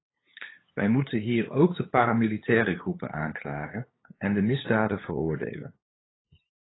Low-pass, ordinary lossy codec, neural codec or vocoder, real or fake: 7.2 kHz; AAC, 16 kbps; codec, 16 kHz, 8 kbps, FunCodec, trained on LibriTTS, 25 frames a second; fake